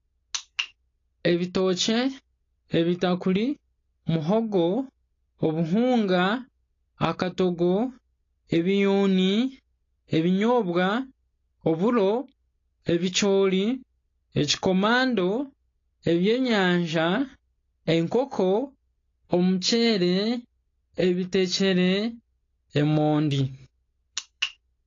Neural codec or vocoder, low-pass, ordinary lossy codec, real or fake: none; 7.2 kHz; AAC, 32 kbps; real